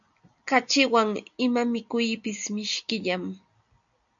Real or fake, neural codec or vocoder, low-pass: real; none; 7.2 kHz